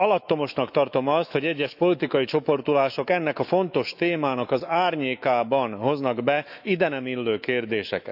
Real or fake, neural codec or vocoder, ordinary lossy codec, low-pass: fake; autoencoder, 48 kHz, 128 numbers a frame, DAC-VAE, trained on Japanese speech; none; 5.4 kHz